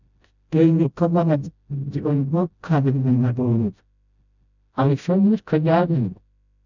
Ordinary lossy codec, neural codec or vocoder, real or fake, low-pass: none; codec, 16 kHz, 0.5 kbps, FreqCodec, smaller model; fake; 7.2 kHz